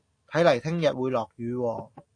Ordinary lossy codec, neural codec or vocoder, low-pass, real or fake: AAC, 48 kbps; none; 9.9 kHz; real